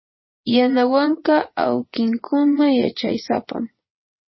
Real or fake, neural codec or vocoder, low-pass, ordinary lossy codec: fake; vocoder, 22.05 kHz, 80 mel bands, Vocos; 7.2 kHz; MP3, 24 kbps